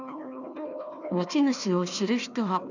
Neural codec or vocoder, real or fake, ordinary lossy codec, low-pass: codec, 16 kHz, 1 kbps, FunCodec, trained on Chinese and English, 50 frames a second; fake; none; 7.2 kHz